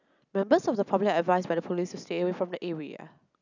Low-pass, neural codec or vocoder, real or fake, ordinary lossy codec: 7.2 kHz; none; real; none